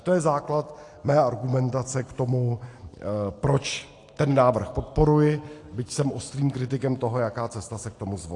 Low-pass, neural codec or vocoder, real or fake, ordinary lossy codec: 10.8 kHz; none; real; AAC, 48 kbps